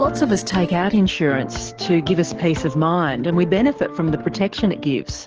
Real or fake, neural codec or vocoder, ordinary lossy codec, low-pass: fake; codec, 44.1 kHz, 7.8 kbps, DAC; Opus, 16 kbps; 7.2 kHz